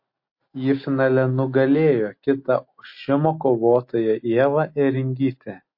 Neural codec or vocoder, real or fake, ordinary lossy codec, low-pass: none; real; MP3, 32 kbps; 5.4 kHz